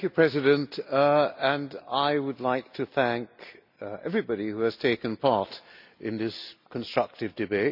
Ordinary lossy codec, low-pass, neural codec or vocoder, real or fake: none; 5.4 kHz; none; real